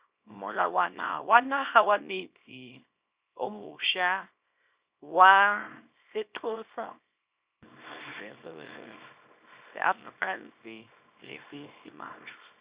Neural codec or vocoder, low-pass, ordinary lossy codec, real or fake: codec, 24 kHz, 0.9 kbps, WavTokenizer, small release; 3.6 kHz; Opus, 64 kbps; fake